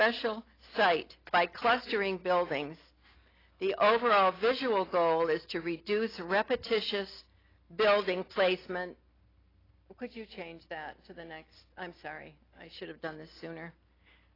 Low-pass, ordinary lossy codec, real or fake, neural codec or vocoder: 5.4 kHz; AAC, 24 kbps; real; none